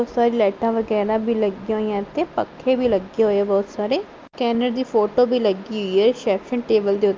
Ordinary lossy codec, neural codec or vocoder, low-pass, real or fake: Opus, 24 kbps; none; 7.2 kHz; real